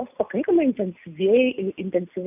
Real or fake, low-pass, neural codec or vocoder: real; 3.6 kHz; none